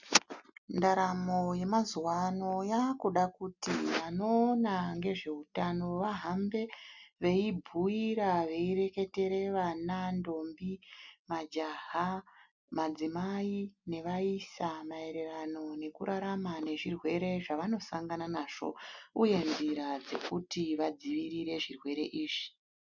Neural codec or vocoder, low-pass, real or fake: none; 7.2 kHz; real